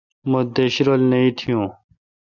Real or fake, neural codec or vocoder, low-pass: real; none; 7.2 kHz